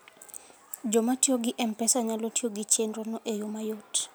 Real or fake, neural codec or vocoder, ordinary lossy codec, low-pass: real; none; none; none